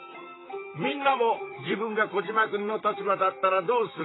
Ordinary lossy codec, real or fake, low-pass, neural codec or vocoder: AAC, 16 kbps; fake; 7.2 kHz; vocoder, 44.1 kHz, 128 mel bands, Pupu-Vocoder